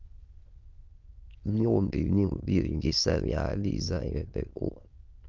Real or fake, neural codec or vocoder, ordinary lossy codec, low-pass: fake; autoencoder, 22.05 kHz, a latent of 192 numbers a frame, VITS, trained on many speakers; Opus, 32 kbps; 7.2 kHz